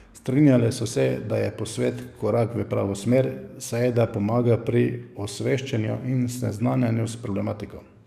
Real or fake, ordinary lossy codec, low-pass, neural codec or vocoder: fake; none; 14.4 kHz; codec, 44.1 kHz, 7.8 kbps, DAC